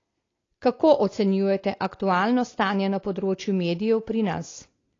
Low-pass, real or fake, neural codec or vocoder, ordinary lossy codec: 7.2 kHz; real; none; AAC, 32 kbps